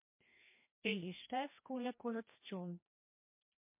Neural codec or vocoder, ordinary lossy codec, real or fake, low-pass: codec, 16 kHz, 1 kbps, FreqCodec, larger model; MP3, 24 kbps; fake; 3.6 kHz